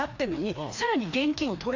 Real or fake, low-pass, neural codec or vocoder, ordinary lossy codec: fake; 7.2 kHz; codec, 16 kHz, 2 kbps, FreqCodec, larger model; AAC, 48 kbps